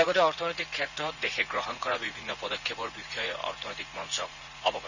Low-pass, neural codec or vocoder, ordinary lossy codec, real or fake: 7.2 kHz; vocoder, 44.1 kHz, 80 mel bands, Vocos; none; fake